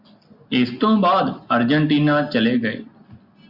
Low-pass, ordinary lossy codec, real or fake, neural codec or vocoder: 5.4 kHz; Opus, 64 kbps; real; none